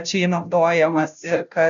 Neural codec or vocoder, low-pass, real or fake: codec, 16 kHz, 0.5 kbps, FunCodec, trained on Chinese and English, 25 frames a second; 7.2 kHz; fake